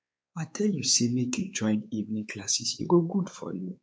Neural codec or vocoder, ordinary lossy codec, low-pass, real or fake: codec, 16 kHz, 4 kbps, X-Codec, WavLM features, trained on Multilingual LibriSpeech; none; none; fake